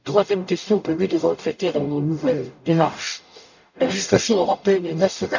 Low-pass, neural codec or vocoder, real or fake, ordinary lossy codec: 7.2 kHz; codec, 44.1 kHz, 0.9 kbps, DAC; fake; none